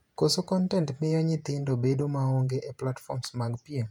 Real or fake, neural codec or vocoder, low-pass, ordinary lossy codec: fake; vocoder, 48 kHz, 128 mel bands, Vocos; 19.8 kHz; none